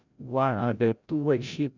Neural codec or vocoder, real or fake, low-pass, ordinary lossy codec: codec, 16 kHz, 0.5 kbps, FreqCodec, larger model; fake; 7.2 kHz; none